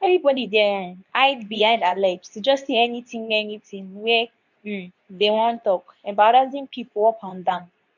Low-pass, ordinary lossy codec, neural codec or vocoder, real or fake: 7.2 kHz; none; codec, 24 kHz, 0.9 kbps, WavTokenizer, medium speech release version 2; fake